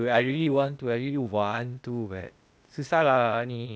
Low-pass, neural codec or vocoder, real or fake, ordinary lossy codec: none; codec, 16 kHz, 0.8 kbps, ZipCodec; fake; none